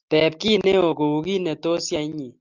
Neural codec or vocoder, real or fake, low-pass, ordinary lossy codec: none; real; 7.2 kHz; Opus, 24 kbps